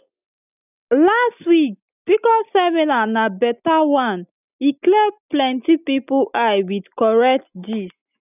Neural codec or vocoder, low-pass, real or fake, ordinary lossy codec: none; 3.6 kHz; real; none